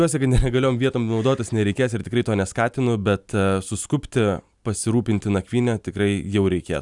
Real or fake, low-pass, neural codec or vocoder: real; 10.8 kHz; none